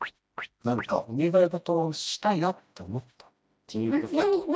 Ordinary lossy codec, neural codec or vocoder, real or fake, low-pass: none; codec, 16 kHz, 1 kbps, FreqCodec, smaller model; fake; none